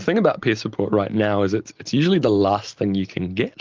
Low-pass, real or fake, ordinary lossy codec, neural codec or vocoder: 7.2 kHz; real; Opus, 32 kbps; none